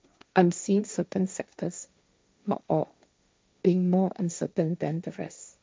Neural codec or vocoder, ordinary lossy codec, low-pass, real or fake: codec, 16 kHz, 1.1 kbps, Voila-Tokenizer; none; none; fake